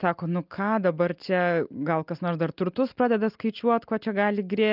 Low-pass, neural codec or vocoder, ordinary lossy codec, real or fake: 5.4 kHz; none; Opus, 24 kbps; real